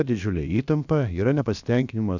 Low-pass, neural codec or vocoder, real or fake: 7.2 kHz; codec, 16 kHz, about 1 kbps, DyCAST, with the encoder's durations; fake